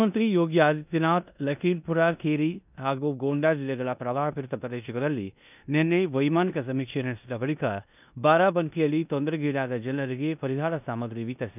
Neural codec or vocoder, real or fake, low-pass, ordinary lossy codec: codec, 16 kHz in and 24 kHz out, 0.9 kbps, LongCat-Audio-Codec, four codebook decoder; fake; 3.6 kHz; none